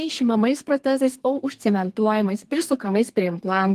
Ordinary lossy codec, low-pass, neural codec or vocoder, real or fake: Opus, 24 kbps; 14.4 kHz; codec, 32 kHz, 1.9 kbps, SNAC; fake